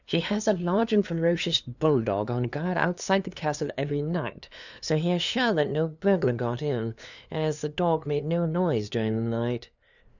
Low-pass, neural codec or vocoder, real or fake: 7.2 kHz; codec, 16 kHz, 2 kbps, FunCodec, trained on LibriTTS, 25 frames a second; fake